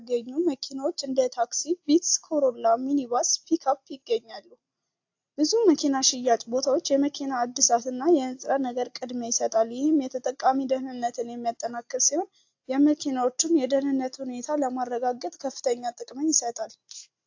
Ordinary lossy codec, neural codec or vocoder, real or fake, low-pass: AAC, 48 kbps; none; real; 7.2 kHz